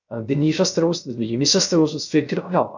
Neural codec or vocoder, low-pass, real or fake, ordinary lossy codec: codec, 16 kHz, 0.3 kbps, FocalCodec; 7.2 kHz; fake; none